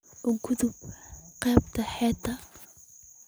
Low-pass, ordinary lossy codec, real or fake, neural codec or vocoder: none; none; real; none